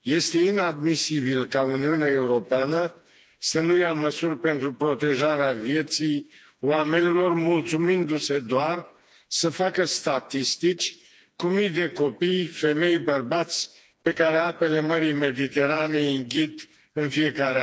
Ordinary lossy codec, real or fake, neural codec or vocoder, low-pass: none; fake; codec, 16 kHz, 2 kbps, FreqCodec, smaller model; none